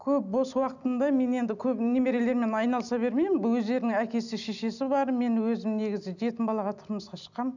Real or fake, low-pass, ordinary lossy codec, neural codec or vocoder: real; 7.2 kHz; none; none